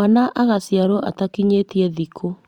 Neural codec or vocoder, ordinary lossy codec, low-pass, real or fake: vocoder, 44.1 kHz, 128 mel bands every 512 samples, BigVGAN v2; Opus, 64 kbps; 19.8 kHz; fake